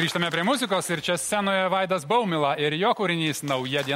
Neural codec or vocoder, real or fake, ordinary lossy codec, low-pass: none; real; MP3, 64 kbps; 19.8 kHz